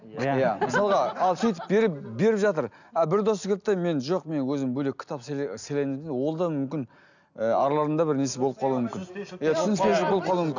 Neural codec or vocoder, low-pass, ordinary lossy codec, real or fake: none; 7.2 kHz; none; real